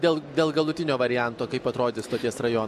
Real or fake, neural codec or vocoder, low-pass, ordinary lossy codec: real; none; 14.4 kHz; MP3, 64 kbps